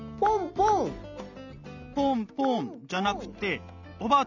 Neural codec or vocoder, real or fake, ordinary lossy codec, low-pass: none; real; none; 7.2 kHz